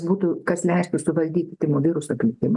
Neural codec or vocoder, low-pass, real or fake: none; 10.8 kHz; real